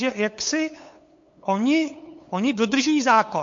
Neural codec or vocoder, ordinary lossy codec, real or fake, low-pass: codec, 16 kHz, 8 kbps, FunCodec, trained on LibriTTS, 25 frames a second; MP3, 48 kbps; fake; 7.2 kHz